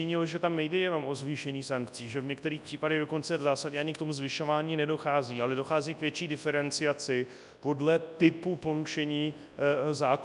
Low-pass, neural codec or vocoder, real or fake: 10.8 kHz; codec, 24 kHz, 0.9 kbps, WavTokenizer, large speech release; fake